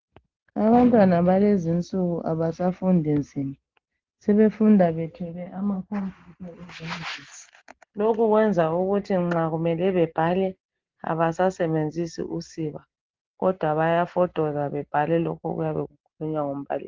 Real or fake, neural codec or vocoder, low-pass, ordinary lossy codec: real; none; 7.2 kHz; Opus, 16 kbps